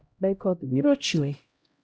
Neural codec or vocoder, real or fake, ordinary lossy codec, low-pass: codec, 16 kHz, 0.5 kbps, X-Codec, HuBERT features, trained on LibriSpeech; fake; none; none